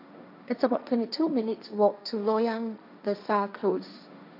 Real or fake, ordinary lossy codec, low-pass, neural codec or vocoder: fake; none; 5.4 kHz; codec, 16 kHz, 1.1 kbps, Voila-Tokenizer